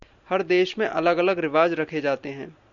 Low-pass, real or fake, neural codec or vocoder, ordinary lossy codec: 7.2 kHz; real; none; MP3, 96 kbps